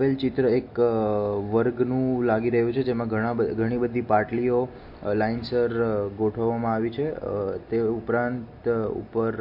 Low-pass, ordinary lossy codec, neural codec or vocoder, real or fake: 5.4 kHz; MP3, 32 kbps; none; real